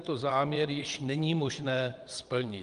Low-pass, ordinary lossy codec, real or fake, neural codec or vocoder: 9.9 kHz; Opus, 32 kbps; fake; vocoder, 22.05 kHz, 80 mel bands, WaveNeXt